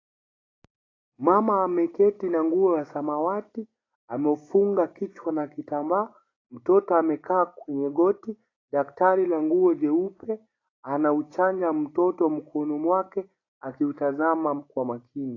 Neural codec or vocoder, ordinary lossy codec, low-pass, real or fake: none; AAC, 32 kbps; 7.2 kHz; real